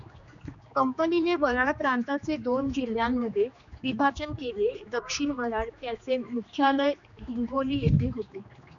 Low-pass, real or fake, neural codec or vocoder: 7.2 kHz; fake; codec, 16 kHz, 2 kbps, X-Codec, HuBERT features, trained on general audio